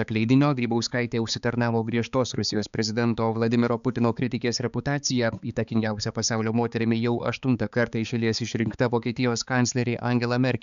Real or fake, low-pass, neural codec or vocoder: fake; 7.2 kHz; codec, 16 kHz, 4 kbps, X-Codec, HuBERT features, trained on balanced general audio